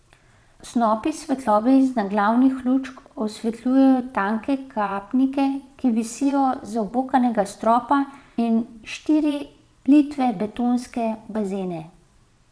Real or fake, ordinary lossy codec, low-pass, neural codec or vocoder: fake; none; none; vocoder, 22.05 kHz, 80 mel bands, Vocos